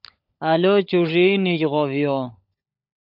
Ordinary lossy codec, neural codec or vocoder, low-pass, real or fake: AAC, 48 kbps; codec, 16 kHz, 16 kbps, FunCodec, trained on LibriTTS, 50 frames a second; 5.4 kHz; fake